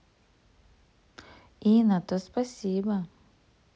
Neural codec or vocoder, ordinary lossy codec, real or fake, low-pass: none; none; real; none